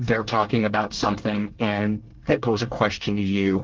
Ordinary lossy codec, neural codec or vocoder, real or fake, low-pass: Opus, 16 kbps; codec, 24 kHz, 1 kbps, SNAC; fake; 7.2 kHz